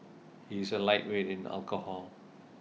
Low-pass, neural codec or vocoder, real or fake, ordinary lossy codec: none; none; real; none